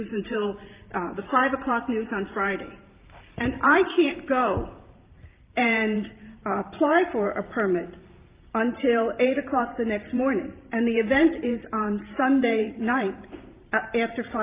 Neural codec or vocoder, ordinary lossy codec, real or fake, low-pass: vocoder, 44.1 kHz, 128 mel bands every 512 samples, BigVGAN v2; Opus, 24 kbps; fake; 3.6 kHz